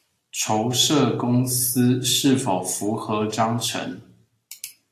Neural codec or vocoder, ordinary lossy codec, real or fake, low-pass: none; AAC, 64 kbps; real; 14.4 kHz